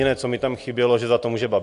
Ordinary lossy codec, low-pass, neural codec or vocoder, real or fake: AAC, 64 kbps; 10.8 kHz; none; real